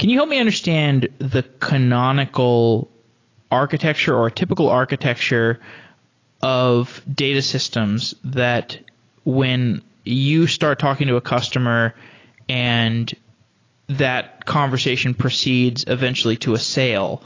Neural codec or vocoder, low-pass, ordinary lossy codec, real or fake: none; 7.2 kHz; AAC, 32 kbps; real